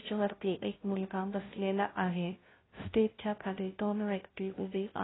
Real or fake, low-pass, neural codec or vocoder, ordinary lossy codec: fake; 7.2 kHz; codec, 16 kHz, 0.5 kbps, FunCodec, trained on Chinese and English, 25 frames a second; AAC, 16 kbps